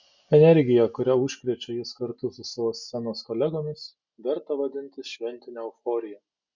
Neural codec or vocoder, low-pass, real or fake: none; 7.2 kHz; real